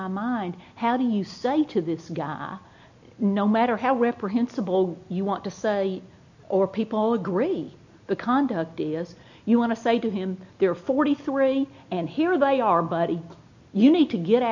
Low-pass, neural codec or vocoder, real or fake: 7.2 kHz; none; real